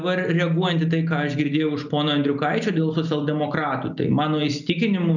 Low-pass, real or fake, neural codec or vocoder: 7.2 kHz; real; none